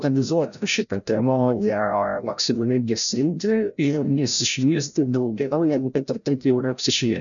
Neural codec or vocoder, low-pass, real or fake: codec, 16 kHz, 0.5 kbps, FreqCodec, larger model; 7.2 kHz; fake